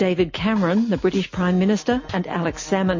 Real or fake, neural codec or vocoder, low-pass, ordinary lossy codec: real; none; 7.2 kHz; MP3, 32 kbps